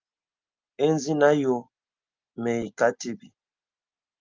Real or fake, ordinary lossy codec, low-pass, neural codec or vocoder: real; Opus, 32 kbps; 7.2 kHz; none